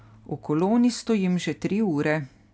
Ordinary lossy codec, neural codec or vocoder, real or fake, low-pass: none; none; real; none